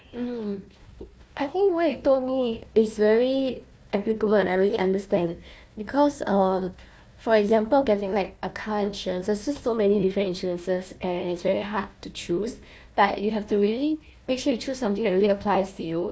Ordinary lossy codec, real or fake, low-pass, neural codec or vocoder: none; fake; none; codec, 16 kHz, 1 kbps, FunCodec, trained on Chinese and English, 50 frames a second